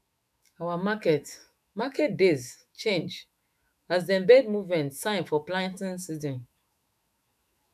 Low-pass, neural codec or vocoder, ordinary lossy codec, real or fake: 14.4 kHz; autoencoder, 48 kHz, 128 numbers a frame, DAC-VAE, trained on Japanese speech; none; fake